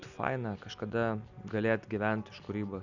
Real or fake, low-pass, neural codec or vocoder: real; 7.2 kHz; none